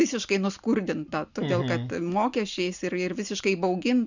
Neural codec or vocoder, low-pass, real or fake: none; 7.2 kHz; real